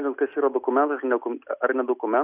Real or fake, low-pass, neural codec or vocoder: real; 3.6 kHz; none